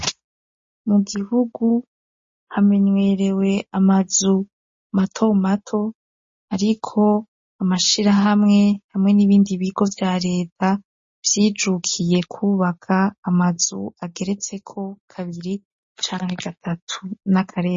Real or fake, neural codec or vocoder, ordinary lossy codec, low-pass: real; none; MP3, 32 kbps; 7.2 kHz